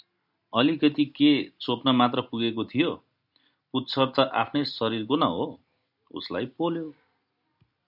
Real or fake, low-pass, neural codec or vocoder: real; 5.4 kHz; none